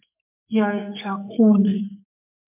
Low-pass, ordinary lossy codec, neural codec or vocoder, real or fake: 3.6 kHz; MP3, 32 kbps; codec, 44.1 kHz, 2.6 kbps, SNAC; fake